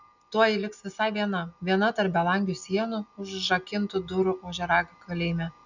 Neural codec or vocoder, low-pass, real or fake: none; 7.2 kHz; real